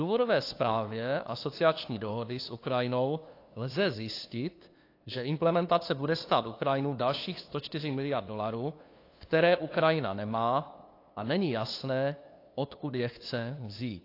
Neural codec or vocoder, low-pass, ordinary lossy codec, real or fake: codec, 16 kHz, 2 kbps, FunCodec, trained on LibriTTS, 25 frames a second; 5.4 kHz; AAC, 32 kbps; fake